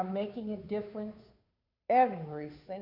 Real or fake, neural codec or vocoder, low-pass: fake; codec, 16 kHz, 2 kbps, FunCodec, trained on Chinese and English, 25 frames a second; 5.4 kHz